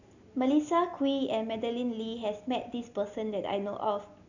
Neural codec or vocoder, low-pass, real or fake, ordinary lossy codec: none; 7.2 kHz; real; none